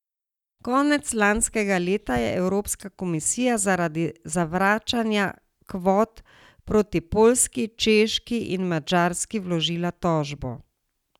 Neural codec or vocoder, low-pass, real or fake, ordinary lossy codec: none; 19.8 kHz; real; none